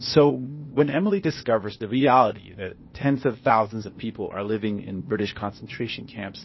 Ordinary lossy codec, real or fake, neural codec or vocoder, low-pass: MP3, 24 kbps; fake; codec, 16 kHz, 0.8 kbps, ZipCodec; 7.2 kHz